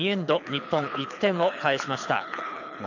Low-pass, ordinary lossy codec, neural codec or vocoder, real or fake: 7.2 kHz; none; codec, 24 kHz, 6 kbps, HILCodec; fake